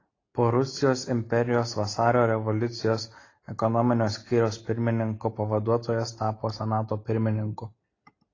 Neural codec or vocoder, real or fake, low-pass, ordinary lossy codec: none; real; 7.2 kHz; AAC, 32 kbps